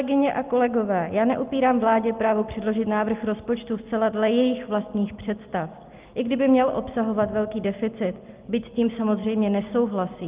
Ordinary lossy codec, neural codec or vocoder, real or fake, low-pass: Opus, 32 kbps; none; real; 3.6 kHz